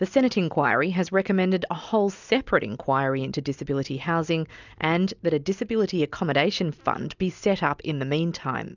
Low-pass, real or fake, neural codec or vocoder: 7.2 kHz; real; none